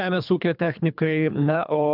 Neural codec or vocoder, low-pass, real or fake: codec, 24 kHz, 3 kbps, HILCodec; 5.4 kHz; fake